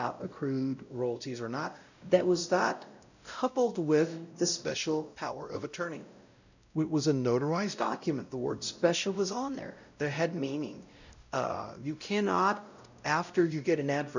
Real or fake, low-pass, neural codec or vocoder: fake; 7.2 kHz; codec, 16 kHz, 0.5 kbps, X-Codec, WavLM features, trained on Multilingual LibriSpeech